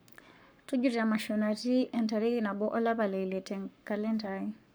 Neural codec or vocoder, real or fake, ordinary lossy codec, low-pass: codec, 44.1 kHz, 7.8 kbps, Pupu-Codec; fake; none; none